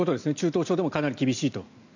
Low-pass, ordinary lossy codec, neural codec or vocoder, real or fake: 7.2 kHz; none; none; real